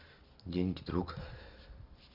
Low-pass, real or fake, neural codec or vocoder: 5.4 kHz; real; none